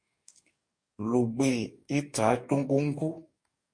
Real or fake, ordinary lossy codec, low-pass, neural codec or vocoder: fake; MP3, 48 kbps; 9.9 kHz; codec, 44.1 kHz, 2.6 kbps, DAC